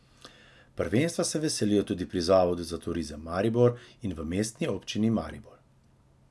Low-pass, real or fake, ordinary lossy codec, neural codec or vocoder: none; real; none; none